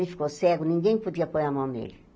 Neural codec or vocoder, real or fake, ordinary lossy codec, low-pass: none; real; none; none